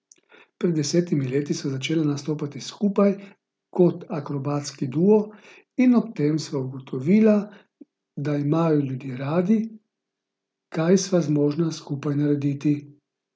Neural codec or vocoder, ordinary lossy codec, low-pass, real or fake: none; none; none; real